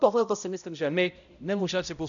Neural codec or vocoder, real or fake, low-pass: codec, 16 kHz, 0.5 kbps, X-Codec, HuBERT features, trained on balanced general audio; fake; 7.2 kHz